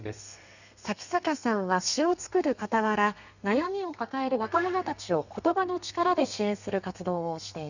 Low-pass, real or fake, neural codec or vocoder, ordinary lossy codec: 7.2 kHz; fake; codec, 32 kHz, 1.9 kbps, SNAC; none